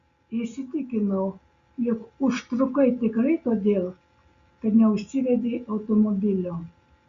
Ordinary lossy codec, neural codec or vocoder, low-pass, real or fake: Opus, 64 kbps; none; 7.2 kHz; real